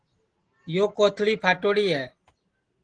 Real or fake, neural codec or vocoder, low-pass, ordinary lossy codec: real; none; 9.9 kHz; Opus, 16 kbps